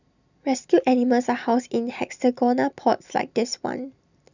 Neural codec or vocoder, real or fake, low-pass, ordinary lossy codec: none; real; 7.2 kHz; none